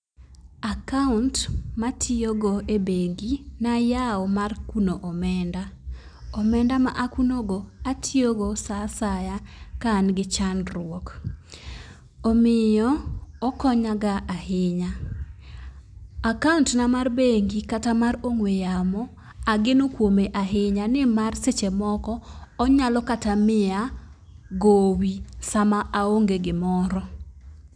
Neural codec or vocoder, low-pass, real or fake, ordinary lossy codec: none; 9.9 kHz; real; none